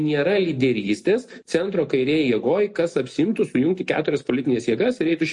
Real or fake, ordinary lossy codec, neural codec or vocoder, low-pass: real; MP3, 48 kbps; none; 10.8 kHz